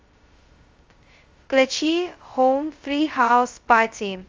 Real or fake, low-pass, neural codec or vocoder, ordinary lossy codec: fake; 7.2 kHz; codec, 16 kHz, 0.2 kbps, FocalCodec; Opus, 32 kbps